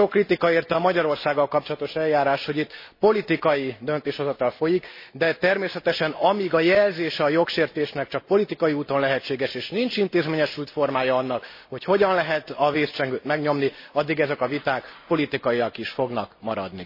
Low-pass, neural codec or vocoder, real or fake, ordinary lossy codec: 5.4 kHz; none; real; MP3, 24 kbps